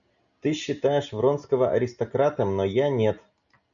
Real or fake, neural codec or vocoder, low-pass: real; none; 7.2 kHz